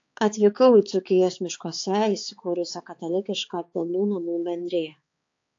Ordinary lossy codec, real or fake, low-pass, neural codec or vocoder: AAC, 48 kbps; fake; 7.2 kHz; codec, 16 kHz, 4 kbps, X-Codec, HuBERT features, trained on balanced general audio